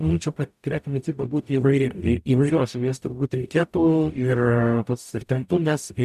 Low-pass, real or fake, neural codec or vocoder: 14.4 kHz; fake; codec, 44.1 kHz, 0.9 kbps, DAC